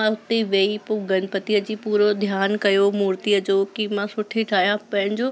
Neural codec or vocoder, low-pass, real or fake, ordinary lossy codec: none; none; real; none